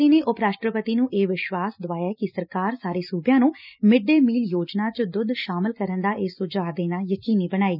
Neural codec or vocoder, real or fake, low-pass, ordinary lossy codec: none; real; 5.4 kHz; none